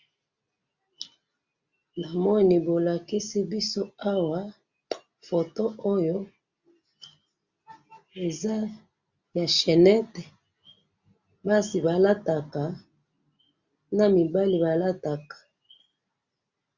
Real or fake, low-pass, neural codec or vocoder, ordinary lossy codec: real; 7.2 kHz; none; Opus, 64 kbps